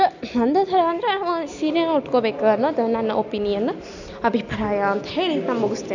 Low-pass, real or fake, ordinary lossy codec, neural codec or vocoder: 7.2 kHz; real; none; none